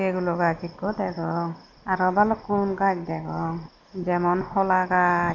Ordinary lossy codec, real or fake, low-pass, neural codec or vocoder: none; real; 7.2 kHz; none